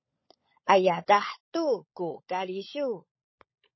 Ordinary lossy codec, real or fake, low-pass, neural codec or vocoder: MP3, 24 kbps; fake; 7.2 kHz; codec, 16 kHz, 16 kbps, FunCodec, trained on LibriTTS, 50 frames a second